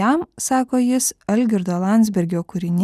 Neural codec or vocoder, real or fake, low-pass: none; real; 14.4 kHz